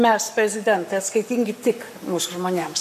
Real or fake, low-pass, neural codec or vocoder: fake; 14.4 kHz; codec, 44.1 kHz, 7.8 kbps, Pupu-Codec